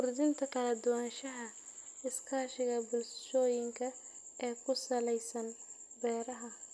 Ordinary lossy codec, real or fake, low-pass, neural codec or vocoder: none; real; none; none